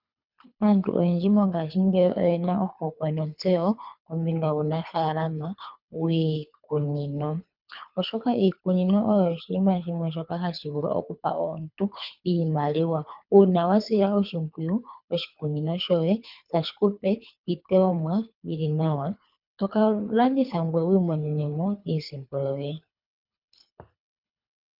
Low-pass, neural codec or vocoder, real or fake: 5.4 kHz; codec, 24 kHz, 3 kbps, HILCodec; fake